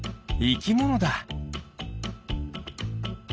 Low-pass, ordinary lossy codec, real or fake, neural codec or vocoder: none; none; real; none